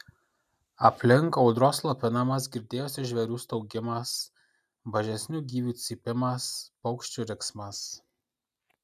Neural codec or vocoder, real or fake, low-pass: none; real; 14.4 kHz